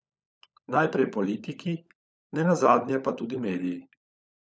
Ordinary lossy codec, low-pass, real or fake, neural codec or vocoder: none; none; fake; codec, 16 kHz, 16 kbps, FunCodec, trained on LibriTTS, 50 frames a second